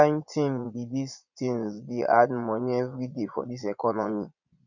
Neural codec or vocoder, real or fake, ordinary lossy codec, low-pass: vocoder, 22.05 kHz, 80 mel bands, Vocos; fake; none; 7.2 kHz